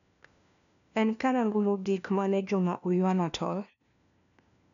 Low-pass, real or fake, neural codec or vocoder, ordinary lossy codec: 7.2 kHz; fake; codec, 16 kHz, 1 kbps, FunCodec, trained on LibriTTS, 50 frames a second; none